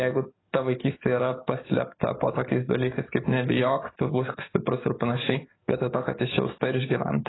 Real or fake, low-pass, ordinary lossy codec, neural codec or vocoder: fake; 7.2 kHz; AAC, 16 kbps; codec, 44.1 kHz, 7.8 kbps, DAC